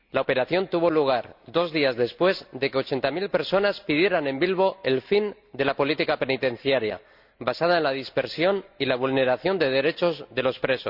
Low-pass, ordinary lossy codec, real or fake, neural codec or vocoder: 5.4 kHz; Opus, 64 kbps; real; none